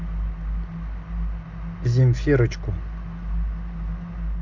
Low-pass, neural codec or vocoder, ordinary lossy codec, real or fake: 7.2 kHz; none; MP3, 64 kbps; real